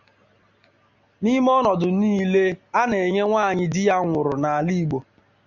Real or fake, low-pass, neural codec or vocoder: real; 7.2 kHz; none